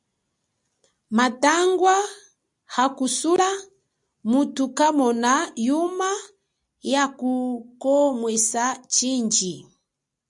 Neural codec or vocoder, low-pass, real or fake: none; 10.8 kHz; real